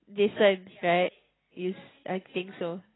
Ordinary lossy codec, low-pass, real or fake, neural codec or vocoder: AAC, 16 kbps; 7.2 kHz; real; none